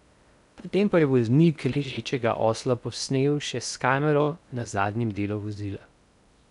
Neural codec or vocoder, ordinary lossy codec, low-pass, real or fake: codec, 16 kHz in and 24 kHz out, 0.6 kbps, FocalCodec, streaming, 2048 codes; none; 10.8 kHz; fake